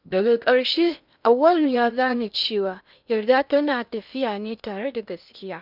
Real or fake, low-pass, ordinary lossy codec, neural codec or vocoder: fake; 5.4 kHz; none; codec, 16 kHz in and 24 kHz out, 0.8 kbps, FocalCodec, streaming, 65536 codes